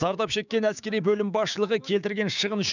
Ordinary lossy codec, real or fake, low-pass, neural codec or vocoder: none; fake; 7.2 kHz; codec, 16 kHz, 6 kbps, DAC